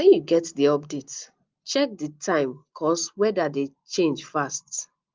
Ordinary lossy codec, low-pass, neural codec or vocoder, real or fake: Opus, 32 kbps; 7.2 kHz; none; real